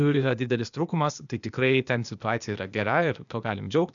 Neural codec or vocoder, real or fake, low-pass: codec, 16 kHz, 0.8 kbps, ZipCodec; fake; 7.2 kHz